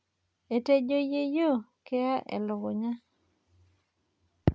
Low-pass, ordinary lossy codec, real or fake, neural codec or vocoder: none; none; real; none